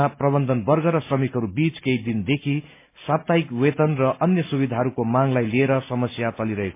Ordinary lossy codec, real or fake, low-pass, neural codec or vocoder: MP3, 24 kbps; real; 3.6 kHz; none